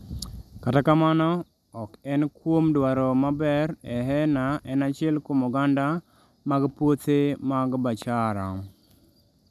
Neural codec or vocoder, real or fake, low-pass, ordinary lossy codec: none; real; 14.4 kHz; none